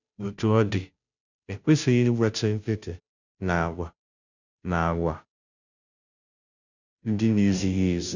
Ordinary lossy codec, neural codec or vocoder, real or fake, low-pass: none; codec, 16 kHz, 0.5 kbps, FunCodec, trained on Chinese and English, 25 frames a second; fake; 7.2 kHz